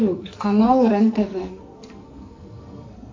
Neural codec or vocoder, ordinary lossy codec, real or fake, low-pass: codec, 44.1 kHz, 2.6 kbps, SNAC; AAC, 48 kbps; fake; 7.2 kHz